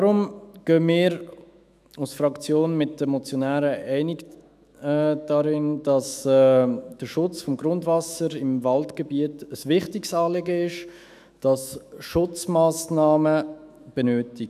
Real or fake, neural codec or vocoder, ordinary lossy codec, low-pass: fake; autoencoder, 48 kHz, 128 numbers a frame, DAC-VAE, trained on Japanese speech; none; 14.4 kHz